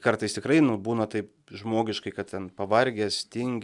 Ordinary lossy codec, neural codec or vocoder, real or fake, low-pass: MP3, 96 kbps; none; real; 10.8 kHz